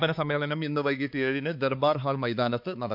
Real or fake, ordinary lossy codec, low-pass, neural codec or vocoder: fake; none; 5.4 kHz; codec, 16 kHz, 4 kbps, X-Codec, HuBERT features, trained on balanced general audio